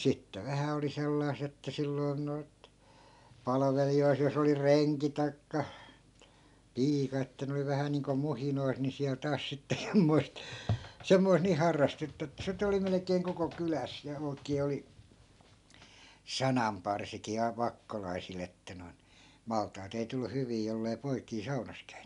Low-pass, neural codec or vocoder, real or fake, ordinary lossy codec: 10.8 kHz; none; real; none